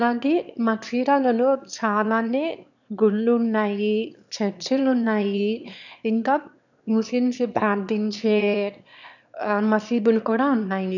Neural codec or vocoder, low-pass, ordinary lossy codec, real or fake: autoencoder, 22.05 kHz, a latent of 192 numbers a frame, VITS, trained on one speaker; 7.2 kHz; none; fake